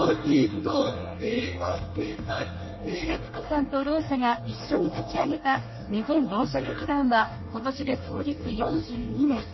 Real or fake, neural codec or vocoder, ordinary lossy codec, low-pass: fake; codec, 24 kHz, 1 kbps, SNAC; MP3, 24 kbps; 7.2 kHz